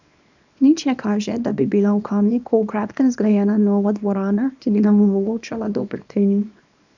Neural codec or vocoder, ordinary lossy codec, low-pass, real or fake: codec, 24 kHz, 0.9 kbps, WavTokenizer, small release; none; 7.2 kHz; fake